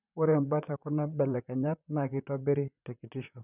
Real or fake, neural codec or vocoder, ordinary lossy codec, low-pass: fake; vocoder, 44.1 kHz, 128 mel bands, Pupu-Vocoder; none; 3.6 kHz